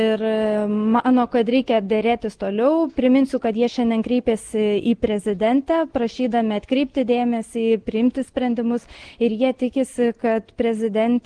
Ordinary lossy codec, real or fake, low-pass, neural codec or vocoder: Opus, 16 kbps; real; 9.9 kHz; none